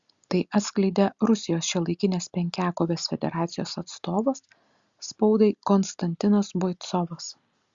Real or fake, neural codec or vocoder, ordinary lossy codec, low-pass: real; none; Opus, 64 kbps; 7.2 kHz